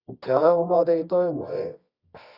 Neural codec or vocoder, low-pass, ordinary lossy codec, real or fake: codec, 24 kHz, 0.9 kbps, WavTokenizer, medium music audio release; 5.4 kHz; Opus, 64 kbps; fake